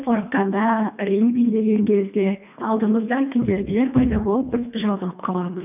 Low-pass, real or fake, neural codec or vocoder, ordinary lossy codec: 3.6 kHz; fake; codec, 24 kHz, 1.5 kbps, HILCodec; none